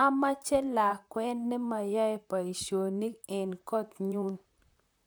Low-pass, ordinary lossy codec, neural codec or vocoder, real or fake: none; none; vocoder, 44.1 kHz, 128 mel bands, Pupu-Vocoder; fake